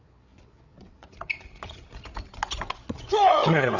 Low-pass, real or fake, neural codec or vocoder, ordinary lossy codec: 7.2 kHz; fake; codec, 16 kHz, 8 kbps, FreqCodec, larger model; none